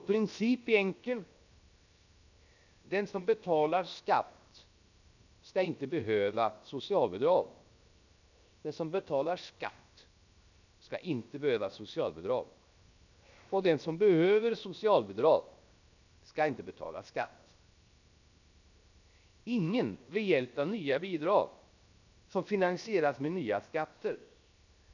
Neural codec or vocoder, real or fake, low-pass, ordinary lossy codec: codec, 16 kHz, 0.7 kbps, FocalCodec; fake; 7.2 kHz; none